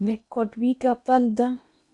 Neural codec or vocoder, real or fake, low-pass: codec, 16 kHz in and 24 kHz out, 0.8 kbps, FocalCodec, streaming, 65536 codes; fake; 10.8 kHz